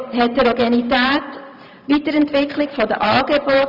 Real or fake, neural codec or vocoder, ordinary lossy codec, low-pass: fake; vocoder, 44.1 kHz, 128 mel bands every 512 samples, BigVGAN v2; none; 5.4 kHz